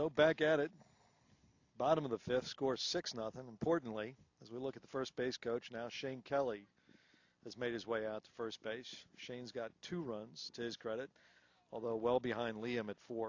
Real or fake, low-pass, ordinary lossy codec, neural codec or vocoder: real; 7.2 kHz; AAC, 48 kbps; none